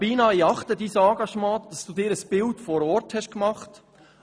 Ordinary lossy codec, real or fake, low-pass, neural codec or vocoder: none; real; none; none